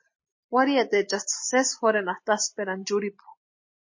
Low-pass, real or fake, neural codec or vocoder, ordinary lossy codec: 7.2 kHz; real; none; MP3, 32 kbps